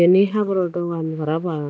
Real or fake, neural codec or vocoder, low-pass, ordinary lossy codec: real; none; none; none